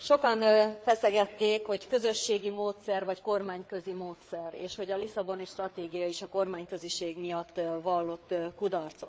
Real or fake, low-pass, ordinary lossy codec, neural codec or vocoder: fake; none; none; codec, 16 kHz, 4 kbps, FreqCodec, larger model